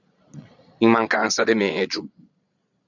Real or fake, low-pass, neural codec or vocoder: fake; 7.2 kHz; vocoder, 22.05 kHz, 80 mel bands, Vocos